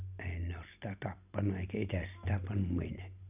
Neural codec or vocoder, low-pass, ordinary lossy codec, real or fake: none; 3.6 kHz; none; real